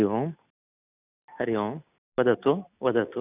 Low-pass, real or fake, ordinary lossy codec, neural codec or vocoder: 3.6 kHz; real; none; none